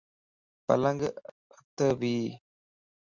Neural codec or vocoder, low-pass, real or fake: none; 7.2 kHz; real